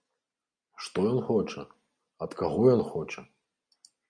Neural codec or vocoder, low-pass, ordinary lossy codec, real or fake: none; 9.9 kHz; MP3, 48 kbps; real